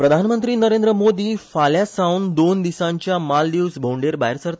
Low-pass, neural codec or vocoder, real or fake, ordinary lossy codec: none; none; real; none